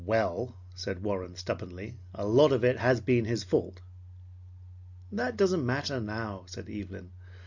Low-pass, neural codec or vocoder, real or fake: 7.2 kHz; none; real